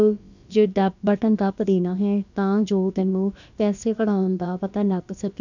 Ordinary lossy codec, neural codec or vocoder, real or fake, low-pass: none; codec, 16 kHz, about 1 kbps, DyCAST, with the encoder's durations; fake; 7.2 kHz